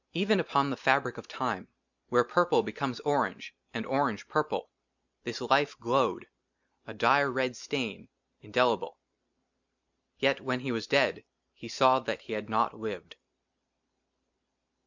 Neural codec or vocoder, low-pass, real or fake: none; 7.2 kHz; real